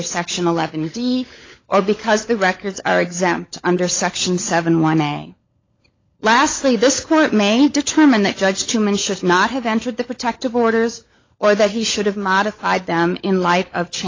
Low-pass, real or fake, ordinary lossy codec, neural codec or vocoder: 7.2 kHz; fake; AAC, 32 kbps; codec, 16 kHz, 16 kbps, FunCodec, trained on LibriTTS, 50 frames a second